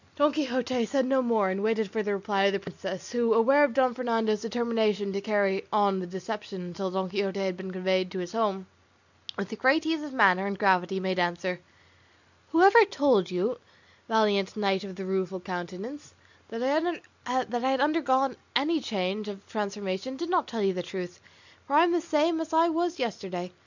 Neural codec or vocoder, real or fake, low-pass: none; real; 7.2 kHz